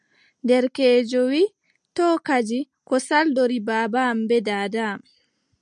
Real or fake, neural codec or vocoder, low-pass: real; none; 9.9 kHz